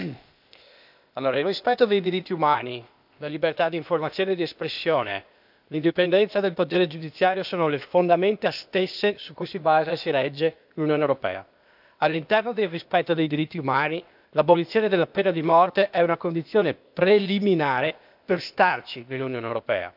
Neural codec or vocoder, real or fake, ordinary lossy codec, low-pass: codec, 16 kHz, 0.8 kbps, ZipCodec; fake; none; 5.4 kHz